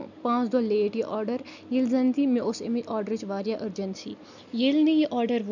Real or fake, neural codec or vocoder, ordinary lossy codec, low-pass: real; none; none; 7.2 kHz